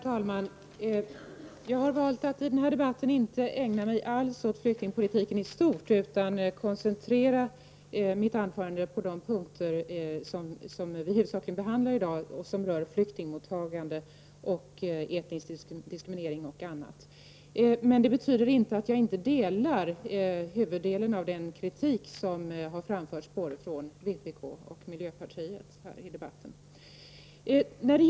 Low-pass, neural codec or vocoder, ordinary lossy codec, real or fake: none; none; none; real